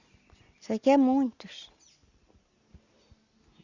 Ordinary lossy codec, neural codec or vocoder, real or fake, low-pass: Opus, 64 kbps; none; real; 7.2 kHz